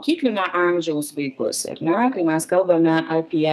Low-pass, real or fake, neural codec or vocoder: 14.4 kHz; fake; codec, 32 kHz, 1.9 kbps, SNAC